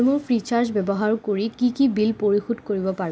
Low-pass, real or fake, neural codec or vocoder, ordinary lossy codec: none; real; none; none